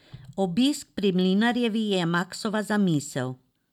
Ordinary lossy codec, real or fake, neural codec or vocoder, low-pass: none; real; none; 19.8 kHz